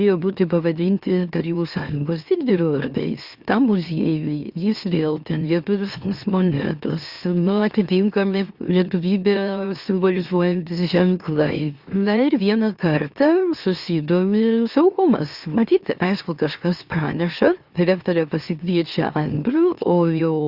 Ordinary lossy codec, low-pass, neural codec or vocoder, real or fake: Opus, 64 kbps; 5.4 kHz; autoencoder, 44.1 kHz, a latent of 192 numbers a frame, MeloTTS; fake